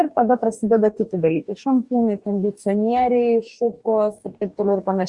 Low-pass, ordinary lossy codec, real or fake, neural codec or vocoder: 10.8 kHz; Opus, 64 kbps; fake; codec, 44.1 kHz, 2.6 kbps, DAC